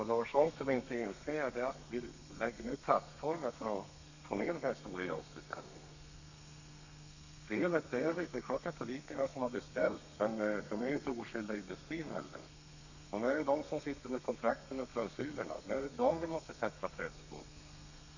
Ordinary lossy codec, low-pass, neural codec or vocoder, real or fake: none; 7.2 kHz; codec, 32 kHz, 1.9 kbps, SNAC; fake